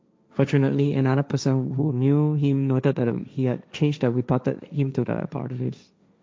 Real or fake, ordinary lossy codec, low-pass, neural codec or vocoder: fake; none; none; codec, 16 kHz, 1.1 kbps, Voila-Tokenizer